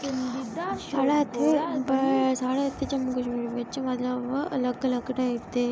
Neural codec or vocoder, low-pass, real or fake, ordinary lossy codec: none; none; real; none